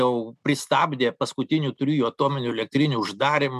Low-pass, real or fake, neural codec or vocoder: 14.4 kHz; real; none